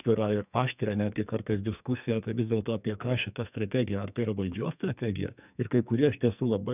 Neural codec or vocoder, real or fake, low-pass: codec, 44.1 kHz, 2.6 kbps, SNAC; fake; 3.6 kHz